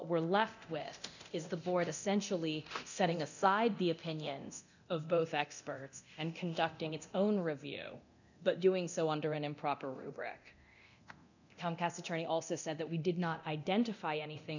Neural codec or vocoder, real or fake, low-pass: codec, 24 kHz, 0.9 kbps, DualCodec; fake; 7.2 kHz